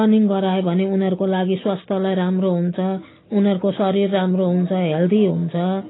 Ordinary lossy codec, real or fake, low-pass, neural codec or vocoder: AAC, 16 kbps; real; 7.2 kHz; none